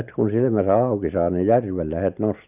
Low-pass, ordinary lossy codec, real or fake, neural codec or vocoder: 3.6 kHz; none; real; none